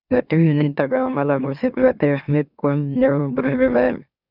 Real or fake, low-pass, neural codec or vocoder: fake; 5.4 kHz; autoencoder, 44.1 kHz, a latent of 192 numbers a frame, MeloTTS